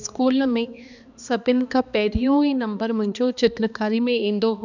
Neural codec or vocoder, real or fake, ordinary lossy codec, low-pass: codec, 16 kHz, 2 kbps, X-Codec, HuBERT features, trained on balanced general audio; fake; none; 7.2 kHz